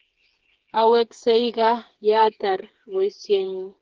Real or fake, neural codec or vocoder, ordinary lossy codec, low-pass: fake; codec, 16 kHz, 4 kbps, FreqCodec, smaller model; Opus, 16 kbps; 7.2 kHz